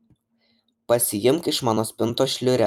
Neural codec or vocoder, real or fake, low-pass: vocoder, 44.1 kHz, 128 mel bands every 256 samples, BigVGAN v2; fake; 19.8 kHz